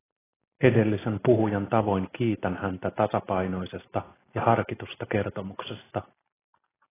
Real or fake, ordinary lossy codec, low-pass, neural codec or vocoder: real; AAC, 16 kbps; 3.6 kHz; none